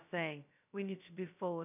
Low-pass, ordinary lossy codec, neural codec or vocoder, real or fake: 3.6 kHz; none; codec, 16 kHz, 0.2 kbps, FocalCodec; fake